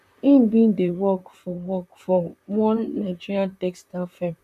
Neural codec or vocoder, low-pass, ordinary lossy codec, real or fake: vocoder, 44.1 kHz, 128 mel bands, Pupu-Vocoder; 14.4 kHz; none; fake